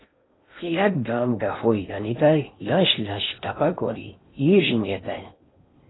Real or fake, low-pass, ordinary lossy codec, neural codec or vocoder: fake; 7.2 kHz; AAC, 16 kbps; codec, 16 kHz in and 24 kHz out, 0.6 kbps, FocalCodec, streaming, 2048 codes